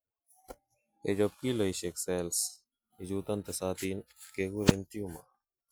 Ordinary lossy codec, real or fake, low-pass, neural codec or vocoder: none; real; none; none